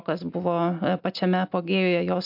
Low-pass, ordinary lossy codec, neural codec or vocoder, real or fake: 5.4 kHz; MP3, 48 kbps; none; real